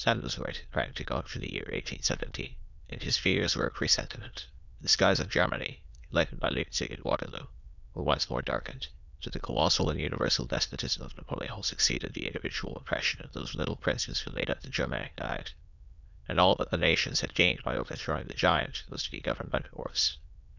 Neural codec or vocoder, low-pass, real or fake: autoencoder, 22.05 kHz, a latent of 192 numbers a frame, VITS, trained on many speakers; 7.2 kHz; fake